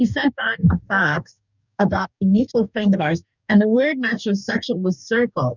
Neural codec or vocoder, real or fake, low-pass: codec, 44.1 kHz, 2.6 kbps, DAC; fake; 7.2 kHz